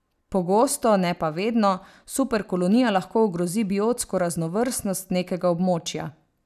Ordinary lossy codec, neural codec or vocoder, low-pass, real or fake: none; none; 14.4 kHz; real